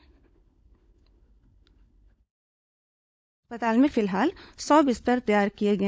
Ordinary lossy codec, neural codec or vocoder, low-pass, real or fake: none; codec, 16 kHz, 16 kbps, FunCodec, trained on LibriTTS, 50 frames a second; none; fake